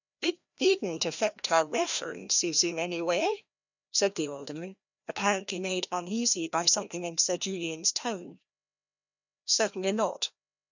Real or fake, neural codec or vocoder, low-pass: fake; codec, 16 kHz, 1 kbps, FreqCodec, larger model; 7.2 kHz